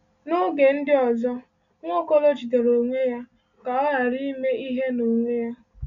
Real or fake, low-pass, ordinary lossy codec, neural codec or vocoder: real; 7.2 kHz; none; none